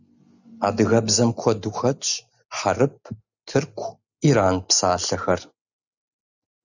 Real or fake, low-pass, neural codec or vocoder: real; 7.2 kHz; none